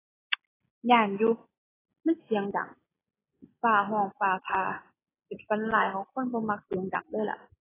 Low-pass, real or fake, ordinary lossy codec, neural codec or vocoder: 3.6 kHz; real; AAC, 16 kbps; none